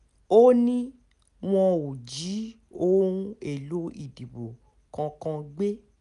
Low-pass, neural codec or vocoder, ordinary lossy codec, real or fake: 10.8 kHz; none; none; real